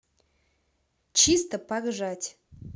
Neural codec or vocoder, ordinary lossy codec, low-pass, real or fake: none; none; none; real